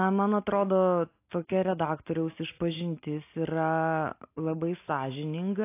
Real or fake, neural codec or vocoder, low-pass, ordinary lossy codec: real; none; 3.6 kHz; AAC, 24 kbps